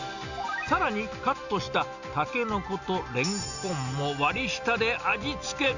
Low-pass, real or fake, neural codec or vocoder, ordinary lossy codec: 7.2 kHz; real; none; none